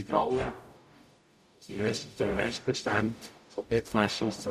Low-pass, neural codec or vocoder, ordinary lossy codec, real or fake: 14.4 kHz; codec, 44.1 kHz, 0.9 kbps, DAC; none; fake